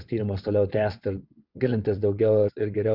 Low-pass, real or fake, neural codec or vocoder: 5.4 kHz; fake; vocoder, 22.05 kHz, 80 mel bands, WaveNeXt